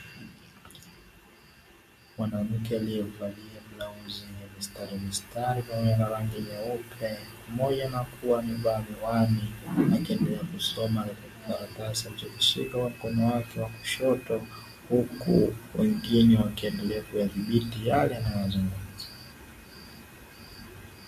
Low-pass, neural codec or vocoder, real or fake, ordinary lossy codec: 14.4 kHz; none; real; MP3, 64 kbps